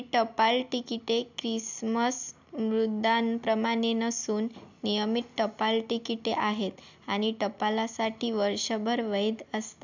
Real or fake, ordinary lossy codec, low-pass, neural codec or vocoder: real; none; 7.2 kHz; none